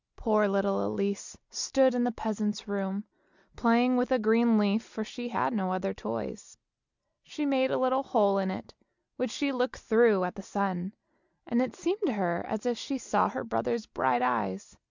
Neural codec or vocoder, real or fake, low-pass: none; real; 7.2 kHz